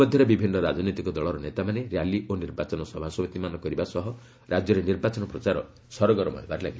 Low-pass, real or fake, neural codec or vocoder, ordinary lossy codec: none; real; none; none